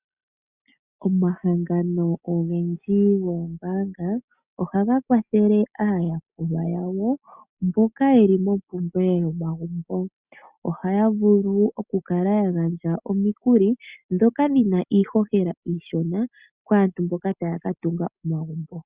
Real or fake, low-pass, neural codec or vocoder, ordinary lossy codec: real; 3.6 kHz; none; Opus, 64 kbps